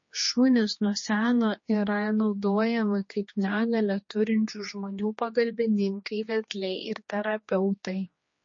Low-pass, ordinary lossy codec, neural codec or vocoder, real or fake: 7.2 kHz; MP3, 32 kbps; codec, 16 kHz, 2 kbps, X-Codec, HuBERT features, trained on general audio; fake